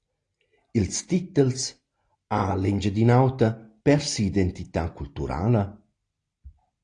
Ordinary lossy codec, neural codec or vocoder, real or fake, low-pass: AAC, 48 kbps; none; real; 9.9 kHz